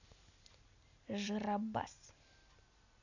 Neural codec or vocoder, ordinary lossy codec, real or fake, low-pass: none; none; real; 7.2 kHz